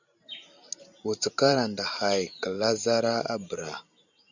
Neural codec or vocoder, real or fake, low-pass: vocoder, 44.1 kHz, 128 mel bands every 512 samples, BigVGAN v2; fake; 7.2 kHz